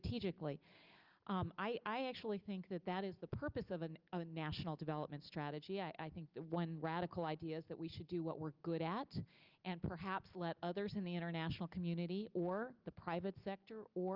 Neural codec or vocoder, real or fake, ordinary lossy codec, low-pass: none; real; Opus, 24 kbps; 5.4 kHz